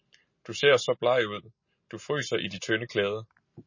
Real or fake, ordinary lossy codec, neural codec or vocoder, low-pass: real; MP3, 32 kbps; none; 7.2 kHz